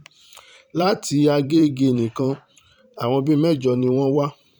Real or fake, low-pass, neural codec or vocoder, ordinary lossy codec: fake; 19.8 kHz; vocoder, 44.1 kHz, 128 mel bands every 512 samples, BigVGAN v2; none